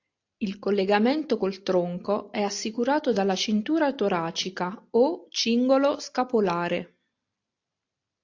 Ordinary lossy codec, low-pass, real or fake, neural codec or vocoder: AAC, 48 kbps; 7.2 kHz; real; none